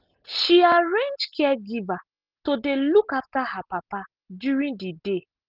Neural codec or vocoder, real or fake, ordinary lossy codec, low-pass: none; real; Opus, 32 kbps; 5.4 kHz